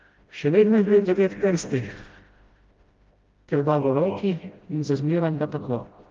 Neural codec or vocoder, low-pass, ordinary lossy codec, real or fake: codec, 16 kHz, 0.5 kbps, FreqCodec, smaller model; 7.2 kHz; Opus, 32 kbps; fake